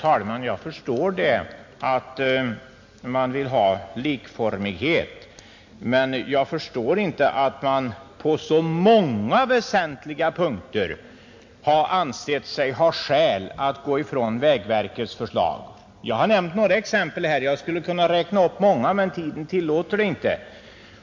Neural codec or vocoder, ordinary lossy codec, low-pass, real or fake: none; MP3, 48 kbps; 7.2 kHz; real